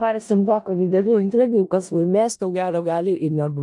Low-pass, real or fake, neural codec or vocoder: 10.8 kHz; fake; codec, 16 kHz in and 24 kHz out, 0.4 kbps, LongCat-Audio-Codec, four codebook decoder